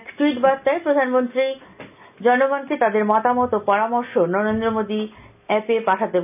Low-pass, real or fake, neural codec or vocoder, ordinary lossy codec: 3.6 kHz; real; none; none